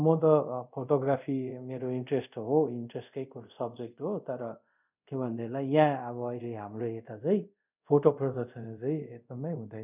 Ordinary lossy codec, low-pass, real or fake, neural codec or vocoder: none; 3.6 kHz; fake; codec, 24 kHz, 0.5 kbps, DualCodec